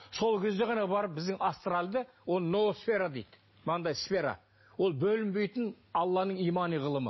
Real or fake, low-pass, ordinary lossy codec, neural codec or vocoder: real; 7.2 kHz; MP3, 24 kbps; none